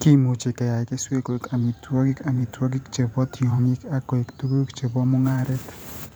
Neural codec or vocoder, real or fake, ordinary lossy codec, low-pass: vocoder, 44.1 kHz, 128 mel bands every 512 samples, BigVGAN v2; fake; none; none